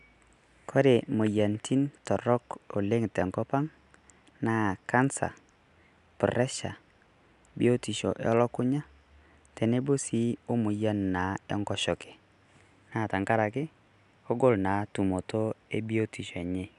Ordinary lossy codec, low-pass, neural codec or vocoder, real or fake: none; 10.8 kHz; none; real